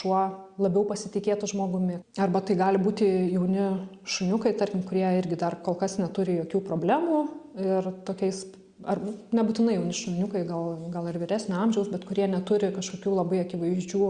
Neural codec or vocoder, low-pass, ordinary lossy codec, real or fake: none; 9.9 kHz; Opus, 64 kbps; real